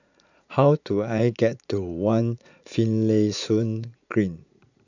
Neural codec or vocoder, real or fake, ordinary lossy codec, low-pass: vocoder, 44.1 kHz, 80 mel bands, Vocos; fake; none; 7.2 kHz